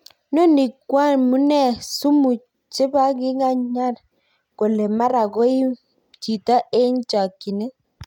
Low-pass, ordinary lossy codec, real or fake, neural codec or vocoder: 19.8 kHz; none; real; none